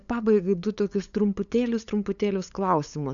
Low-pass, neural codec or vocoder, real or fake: 7.2 kHz; codec, 16 kHz, 8 kbps, FunCodec, trained on LibriTTS, 25 frames a second; fake